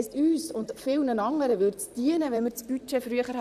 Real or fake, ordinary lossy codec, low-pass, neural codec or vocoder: fake; none; 14.4 kHz; vocoder, 44.1 kHz, 128 mel bands, Pupu-Vocoder